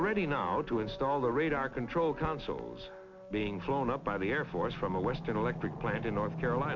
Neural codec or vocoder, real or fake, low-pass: none; real; 7.2 kHz